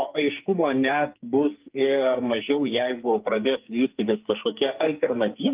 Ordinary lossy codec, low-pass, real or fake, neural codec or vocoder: Opus, 24 kbps; 3.6 kHz; fake; codec, 44.1 kHz, 2.6 kbps, SNAC